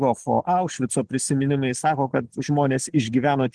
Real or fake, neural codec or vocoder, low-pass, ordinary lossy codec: real; none; 10.8 kHz; Opus, 16 kbps